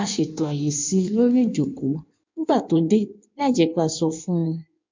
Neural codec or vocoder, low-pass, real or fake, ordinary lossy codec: codec, 16 kHz in and 24 kHz out, 1.1 kbps, FireRedTTS-2 codec; 7.2 kHz; fake; MP3, 64 kbps